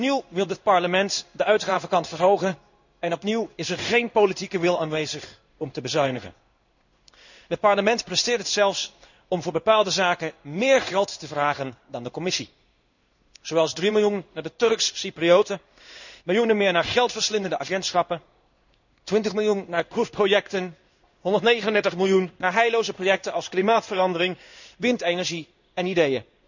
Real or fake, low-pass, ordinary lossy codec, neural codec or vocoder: fake; 7.2 kHz; none; codec, 16 kHz in and 24 kHz out, 1 kbps, XY-Tokenizer